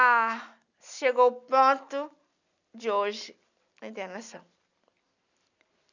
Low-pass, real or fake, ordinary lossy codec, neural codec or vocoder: 7.2 kHz; real; none; none